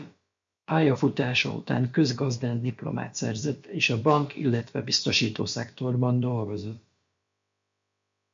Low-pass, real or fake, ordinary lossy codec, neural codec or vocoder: 7.2 kHz; fake; MP3, 48 kbps; codec, 16 kHz, about 1 kbps, DyCAST, with the encoder's durations